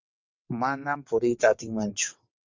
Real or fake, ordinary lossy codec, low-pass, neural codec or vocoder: fake; MP3, 64 kbps; 7.2 kHz; vocoder, 22.05 kHz, 80 mel bands, WaveNeXt